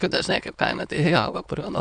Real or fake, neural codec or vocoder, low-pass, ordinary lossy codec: fake; autoencoder, 22.05 kHz, a latent of 192 numbers a frame, VITS, trained on many speakers; 9.9 kHz; AAC, 64 kbps